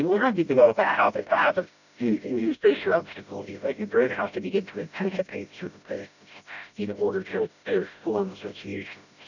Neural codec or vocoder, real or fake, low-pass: codec, 16 kHz, 0.5 kbps, FreqCodec, smaller model; fake; 7.2 kHz